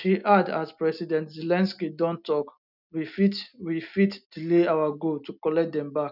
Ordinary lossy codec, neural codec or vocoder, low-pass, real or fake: none; none; 5.4 kHz; real